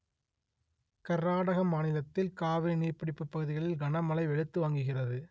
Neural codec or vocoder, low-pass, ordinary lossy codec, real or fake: none; none; none; real